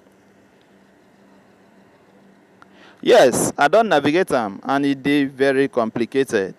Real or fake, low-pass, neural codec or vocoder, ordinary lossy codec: real; 14.4 kHz; none; none